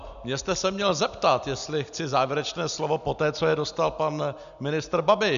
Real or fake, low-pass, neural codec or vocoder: real; 7.2 kHz; none